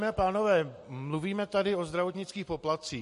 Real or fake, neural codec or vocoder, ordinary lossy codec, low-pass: real; none; MP3, 48 kbps; 14.4 kHz